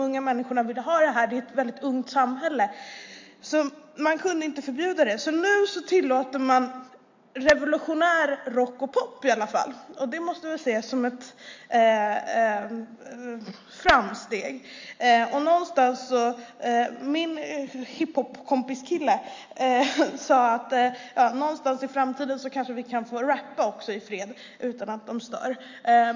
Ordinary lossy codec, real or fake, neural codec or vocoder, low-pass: MP3, 48 kbps; real; none; 7.2 kHz